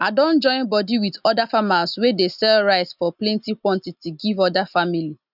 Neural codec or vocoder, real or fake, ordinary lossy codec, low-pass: none; real; none; 5.4 kHz